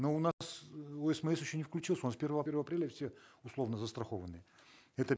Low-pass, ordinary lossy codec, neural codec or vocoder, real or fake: none; none; none; real